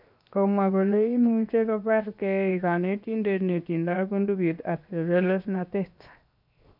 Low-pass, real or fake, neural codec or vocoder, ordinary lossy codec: 5.4 kHz; fake; codec, 16 kHz, 0.7 kbps, FocalCodec; none